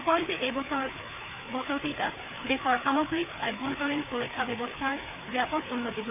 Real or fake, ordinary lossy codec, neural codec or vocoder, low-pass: fake; none; codec, 16 kHz, 4 kbps, FreqCodec, larger model; 3.6 kHz